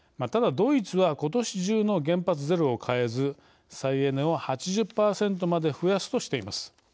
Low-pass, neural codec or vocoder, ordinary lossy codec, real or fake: none; none; none; real